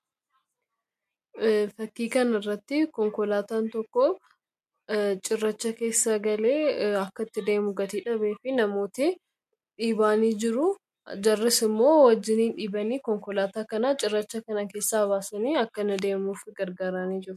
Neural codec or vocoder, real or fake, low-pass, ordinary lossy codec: none; real; 14.4 kHz; MP3, 64 kbps